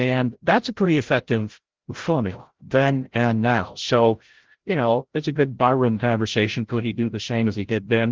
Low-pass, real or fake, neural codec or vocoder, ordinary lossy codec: 7.2 kHz; fake; codec, 16 kHz, 0.5 kbps, FreqCodec, larger model; Opus, 16 kbps